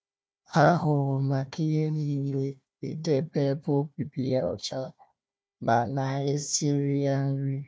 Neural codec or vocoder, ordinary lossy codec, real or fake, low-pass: codec, 16 kHz, 1 kbps, FunCodec, trained on Chinese and English, 50 frames a second; none; fake; none